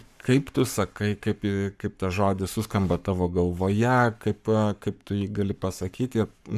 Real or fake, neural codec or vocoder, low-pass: fake; codec, 44.1 kHz, 7.8 kbps, Pupu-Codec; 14.4 kHz